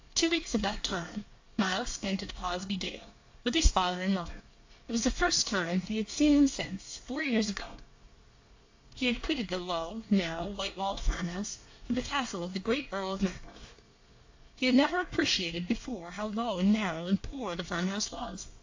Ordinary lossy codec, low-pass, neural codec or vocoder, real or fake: AAC, 48 kbps; 7.2 kHz; codec, 24 kHz, 1 kbps, SNAC; fake